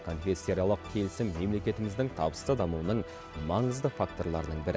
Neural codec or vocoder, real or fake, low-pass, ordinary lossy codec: none; real; none; none